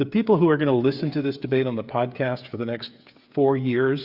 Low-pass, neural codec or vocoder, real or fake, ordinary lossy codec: 5.4 kHz; codec, 16 kHz, 8 kbps, FreqCodec, smaller model; fake; Opus, 64 kbps